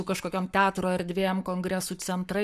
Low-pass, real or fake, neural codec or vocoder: 14.4 kHz; fake; codec, 44.1 kHz, 7.8 kbps, Pupu-Codec